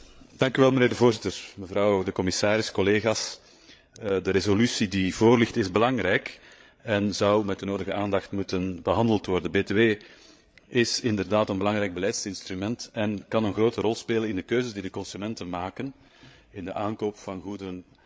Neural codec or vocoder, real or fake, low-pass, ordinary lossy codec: codec, 16 kHz, 8 kbps, FreqCodec, larger model; fake; none; none